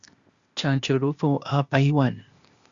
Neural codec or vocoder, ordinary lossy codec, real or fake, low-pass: codec, 16 kHz, 0.8 kbps, ZipCodec; Opus, 64 kbps; fake; 7.2 kHz